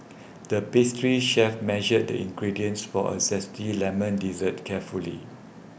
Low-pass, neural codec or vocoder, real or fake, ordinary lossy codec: none; none; real; none